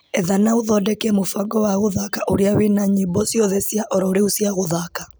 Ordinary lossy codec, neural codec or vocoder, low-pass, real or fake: none; none; none; real